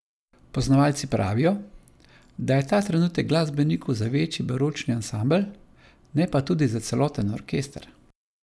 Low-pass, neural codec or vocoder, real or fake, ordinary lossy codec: none; none; real; none